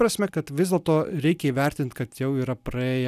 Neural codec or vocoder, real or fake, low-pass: none; real; 14.4 kHz